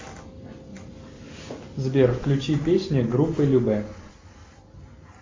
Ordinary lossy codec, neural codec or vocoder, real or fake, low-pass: MP3, 48 kbps; none; real; 7.2 kHz